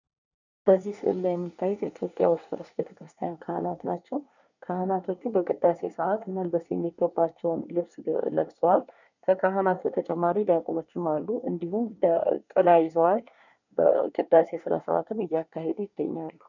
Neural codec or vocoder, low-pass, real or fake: codec, 24 kHz, 1 kbps, SNAC; 7.2 kHz; fake